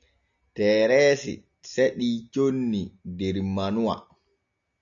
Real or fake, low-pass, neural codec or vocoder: real; 7.2 kHz; none